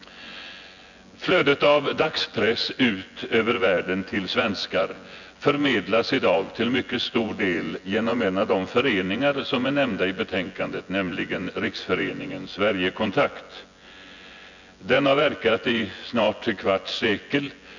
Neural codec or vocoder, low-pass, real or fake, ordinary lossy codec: vocoder, 24 kHz, 100 mel bands, Vocos; 7.2 kHz; fake; none